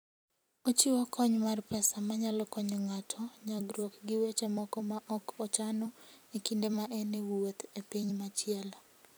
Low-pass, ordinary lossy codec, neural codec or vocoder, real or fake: none; none; vocoder, 44.1 kHz, 128 mel bands every 256 samples, BigVGAN v2; fake